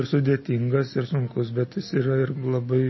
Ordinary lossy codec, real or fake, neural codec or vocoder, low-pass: MP3, 24 kbps; real; none; 7.2 kHz